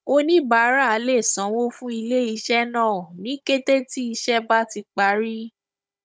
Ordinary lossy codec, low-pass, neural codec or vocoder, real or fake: none; none; codec, 16 kHz, 16 kbps, FunCodec, trained on Chinese and English, 50 frames a second; fake